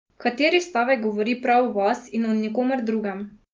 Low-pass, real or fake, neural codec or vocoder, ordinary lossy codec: 7.2 kHz; real; none; Opus, 32 kbps